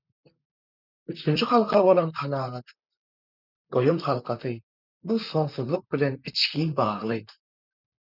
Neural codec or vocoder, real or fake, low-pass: vocoder, 44.1 kHz, 128 mel bands, Pupu-Vocoder; fake; 5.4 kHz